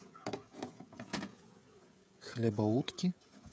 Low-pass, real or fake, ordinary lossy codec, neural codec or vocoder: none; fake; none; codec, 16 kHz, 16 kbps, FreqCodec, smaller model